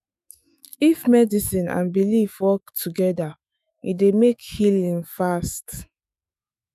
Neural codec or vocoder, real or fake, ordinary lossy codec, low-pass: autoencoder, 48 kHz, 128 numbers a frame, DAC-VAE, trained on Japanese speech; fake; none; 14.4 kHz